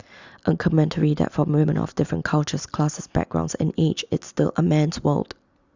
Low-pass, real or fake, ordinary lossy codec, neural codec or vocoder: 7.2 kHz; real; Opus, 64 kbps; none